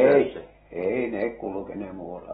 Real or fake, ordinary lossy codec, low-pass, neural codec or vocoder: real; AAC, 16 kbps; 14.4 kHz; none